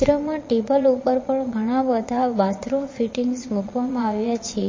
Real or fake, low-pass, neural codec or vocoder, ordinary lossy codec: fake; 7.2 kHz; vocoder, 22.05 kHz, 80 mel bands, Vocos; MP3, 32 kbps